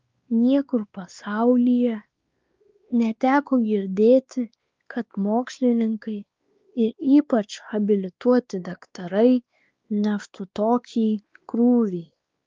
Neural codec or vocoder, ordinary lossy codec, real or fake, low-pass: codec, 16 kHz, 2 kbps, X-Codec, WavLM features, trained on Multilingual LibriSpeech; Opus, 24 kbps; fake; 7.2 kHz